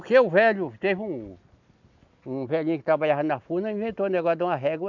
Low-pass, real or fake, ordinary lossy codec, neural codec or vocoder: 7.2 kHz; real; none; none